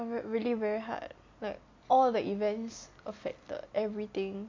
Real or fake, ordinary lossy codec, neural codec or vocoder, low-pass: real; MP3, 48 kbps; none; 7.2 kHz